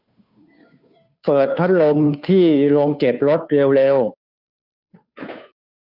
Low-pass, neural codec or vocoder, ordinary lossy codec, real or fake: 5.4 kHz; codec, 16 kHz, 2 kbps, FunCodec, trained on Chinese and English, 25 frames a second; none; fake